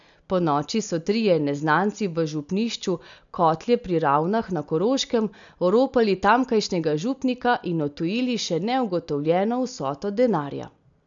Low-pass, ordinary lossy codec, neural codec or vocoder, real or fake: 7.2 kHz; none; none; real